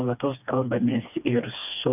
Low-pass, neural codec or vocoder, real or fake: 3.6 kHz; codec, 16 kHz, 2 kbps, FreqCodec, smaller model; fake